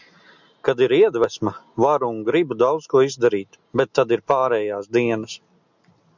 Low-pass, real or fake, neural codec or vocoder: 7.2 kHz; real; none